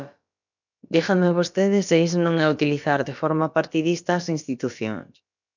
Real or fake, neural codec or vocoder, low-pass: fake; codec, 16 kHz, about 1 kbps, DyCAST, with the encoder's durations; 7.2 kHz